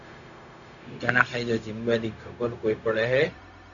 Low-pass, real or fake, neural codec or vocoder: 7.2 kHz; fake; codec, 16 kHz, 0.4 kbps, LongCat-Audio-Codec